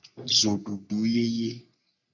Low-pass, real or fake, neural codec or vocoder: 7.2 kHz; fake; codec, 44.1 kHz, 3.4 kbps, Pupu-Codec